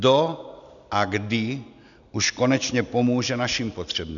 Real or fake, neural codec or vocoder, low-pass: real; none; 7.2 kHz